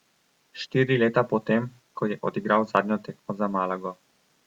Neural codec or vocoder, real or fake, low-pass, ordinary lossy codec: none; real; 19.8 kHz; Opus, 64 kbps